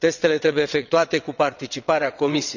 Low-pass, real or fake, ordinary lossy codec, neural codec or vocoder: 7.2 kHz; fake; none; vocoder, 22.05 kHz, 80 mel bands, WaveNeXt